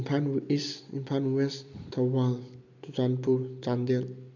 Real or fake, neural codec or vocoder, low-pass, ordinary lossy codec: real; none; 7.2 kHz; none